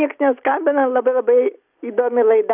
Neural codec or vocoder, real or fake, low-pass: none; real; 3.6 kHz